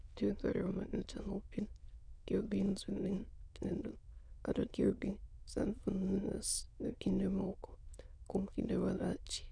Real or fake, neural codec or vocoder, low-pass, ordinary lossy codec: fake; autoencoder, 22.05 kHz, a latent of 192 numbers a frame, VITS, trained on many speakers; 9.9 kHz; none